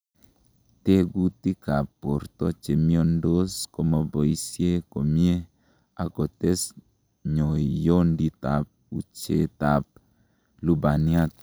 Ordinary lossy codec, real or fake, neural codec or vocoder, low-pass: none; real; none; none